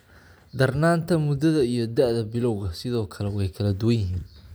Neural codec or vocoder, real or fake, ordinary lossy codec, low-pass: none; real; none; none